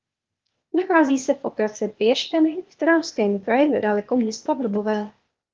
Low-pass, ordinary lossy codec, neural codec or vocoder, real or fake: 7.2 kHz; Opus, 32 kbps; codec, 16 kHz, 0.8 kbps, ZipCodec; fake